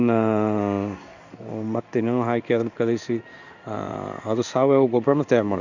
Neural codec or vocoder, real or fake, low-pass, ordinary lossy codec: codec, 16 kHz in and 24 kHz out, 1 kbps, XY-Tokenizer; fake; 7.2 kHz; none